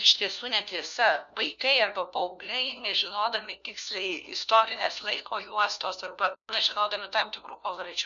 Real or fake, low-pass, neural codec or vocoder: fake; 7.2 kHz; codec, 16 kHz, 1 kbps, FunCodec, trained on LibriTTS, 50 frames a second